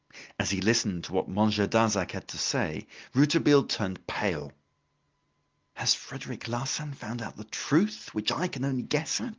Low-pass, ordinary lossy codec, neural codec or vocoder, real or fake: 7.2 kHz; Opus, 32 kbps; none; real